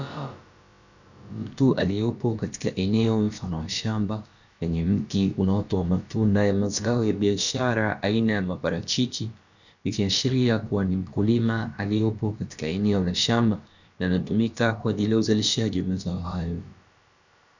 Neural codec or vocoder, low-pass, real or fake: codec, 16 kHz, about 1 kbps, DyCAST, with the encoder's durations; 7.2 kHz; fake